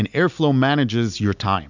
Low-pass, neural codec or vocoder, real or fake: 7.2 kHz; none; real